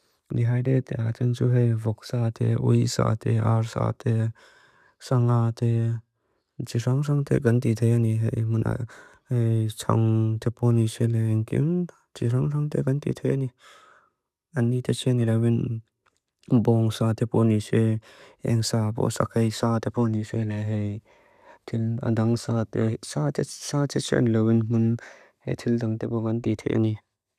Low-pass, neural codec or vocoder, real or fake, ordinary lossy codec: 14.4 kHz; none; real; none